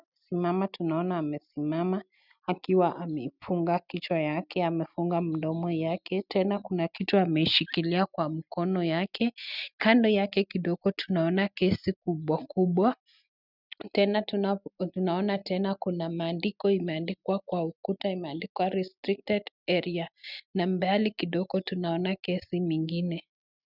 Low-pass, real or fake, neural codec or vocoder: 5.4 kHz; real; none